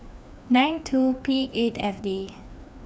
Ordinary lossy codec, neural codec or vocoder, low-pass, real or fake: none; codec, 16 kHz, 2 kbps, FreqCodec, larger model; none; fake